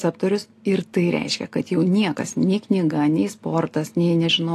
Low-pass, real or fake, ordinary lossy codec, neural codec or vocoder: 14.4 kHz; real; AAC, 48 kbps; none